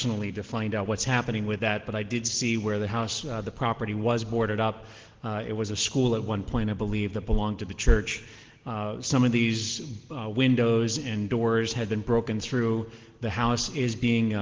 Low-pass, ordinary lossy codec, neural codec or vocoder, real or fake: 7.2 kHz; Opus, 16 kbps; none; real